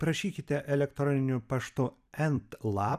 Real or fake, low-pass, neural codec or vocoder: fake; 14.4 kHz; vocoder, 44.1 kHz, 128 mel bands every 256 samples, BigVGAN v2